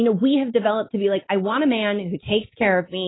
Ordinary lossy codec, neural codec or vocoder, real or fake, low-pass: AAC, 16 kbps; none; real; 7.2 kHz